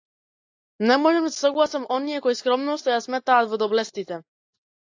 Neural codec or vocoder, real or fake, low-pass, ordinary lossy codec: none; real; 7.2 kHz; AAC, 48 kbps